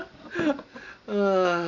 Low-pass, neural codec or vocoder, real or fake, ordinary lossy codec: 7.2 kHz; none; real; none